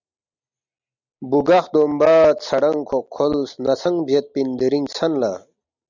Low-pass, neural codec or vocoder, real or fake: 7.2 kHz; none; real